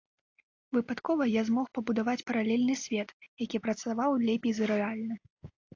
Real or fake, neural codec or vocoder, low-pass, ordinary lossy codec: real; none; 7.2 kHz; AAC, 48 kbps